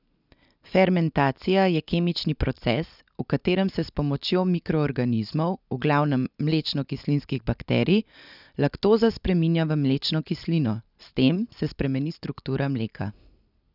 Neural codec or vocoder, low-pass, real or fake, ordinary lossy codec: none; 5.4 kHz; real; none